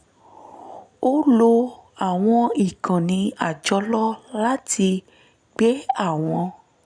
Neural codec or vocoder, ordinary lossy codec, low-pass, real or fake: none; none; 9.9 kHz; real